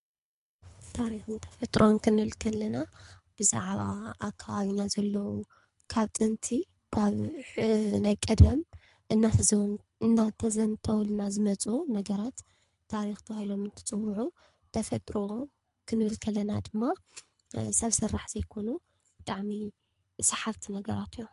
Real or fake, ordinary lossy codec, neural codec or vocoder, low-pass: fake; MP3, 64 kbps; codec, 24 kHz, 3 kbps, HILCodec; 10.8 kHz